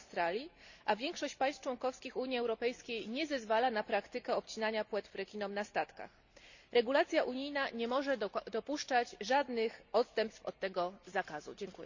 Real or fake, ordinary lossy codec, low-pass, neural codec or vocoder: real; none; 7.2 kHz; none